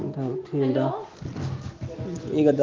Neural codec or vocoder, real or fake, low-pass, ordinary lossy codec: none; real; 7.2 kHz; Opus, 16 kbps